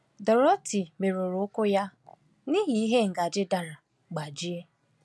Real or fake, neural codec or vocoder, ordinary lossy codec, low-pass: real; none; none; none